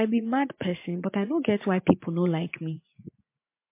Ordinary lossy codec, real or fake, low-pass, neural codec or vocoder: MP3, 24 kbps; fake; 3.6 kHz; vocoder, 44.1 kHz, 80 mel bands, Vocos